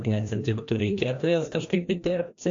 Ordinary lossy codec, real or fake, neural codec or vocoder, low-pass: AAC, 48 kbps; fake; codec, 16 kHz, 1 kbps, FreqCodec, larger model; 7.2 kHz